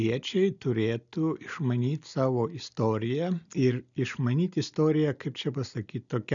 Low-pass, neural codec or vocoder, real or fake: 7.2 kHz; none; real